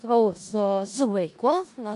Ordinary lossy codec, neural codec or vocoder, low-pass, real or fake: AAC, 96 kbps; codec, 16 kHz in and 24 kHz out, 0.4 kbps, LongCat-Audio-Codec, four codebook decoder; 10.8 kHz; fake